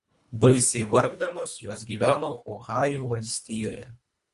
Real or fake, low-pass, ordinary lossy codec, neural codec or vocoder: fake; 10.8 kHz; Opus, 64 kbps; codec, 24 kHz, 1.5 kbps, HILCodec